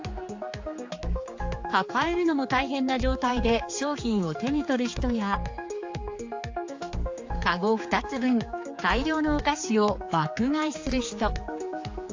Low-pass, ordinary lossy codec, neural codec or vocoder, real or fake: 7.2 kHz; AAC, 48 kbps; codec, 16 kHz, 4 kbps, X-Codec, HuBERT features, trained on general audio; fake